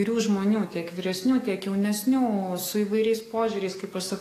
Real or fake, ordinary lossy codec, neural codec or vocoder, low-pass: fake; AAC, 48 kbps; codec, 44.1 kHz, 7.8 kbps, DAC; 14.4 kHz